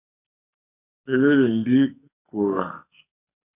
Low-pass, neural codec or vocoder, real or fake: 3.6 kHz; codec, 44.1 kHz, 2.6 kbps, SNAC; fake